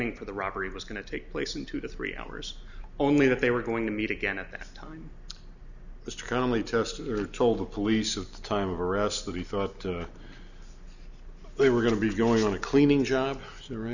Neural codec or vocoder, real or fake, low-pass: none; real; 7.2 kHz